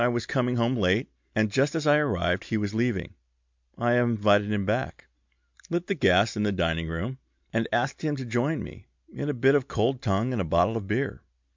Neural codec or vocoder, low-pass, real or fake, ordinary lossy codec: none; 7.2 kHz; real; MP3, 64 kbps